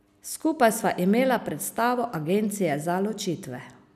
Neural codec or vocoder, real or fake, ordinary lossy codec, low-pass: none; real; none; 14.4 kHz